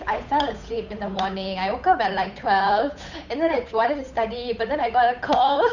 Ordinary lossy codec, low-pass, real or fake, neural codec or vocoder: none; 7.2 kHz; fake; codec, 16 kHz, 8 kbps, FunCodec, trained on Chinese and English, 25 frames a second